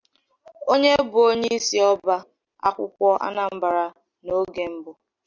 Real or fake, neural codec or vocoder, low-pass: real; none; 7.2 kHz